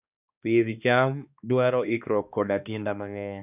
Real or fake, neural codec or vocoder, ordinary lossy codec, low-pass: fake; codec, 16 kHz, 2 kbps, X-Codec, HuBERT features, trained on balanced general audio; none; 3.6 kHz